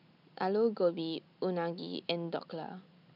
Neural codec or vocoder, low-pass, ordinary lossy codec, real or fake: none; 5.4 kHz; none; real